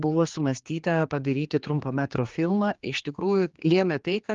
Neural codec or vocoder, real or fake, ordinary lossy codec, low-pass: codec, 16 kHz, 2 kbps, X-Codec, HuBERT features, trained on general audio; fake; Opus, 32 kbps; 7.2 kHz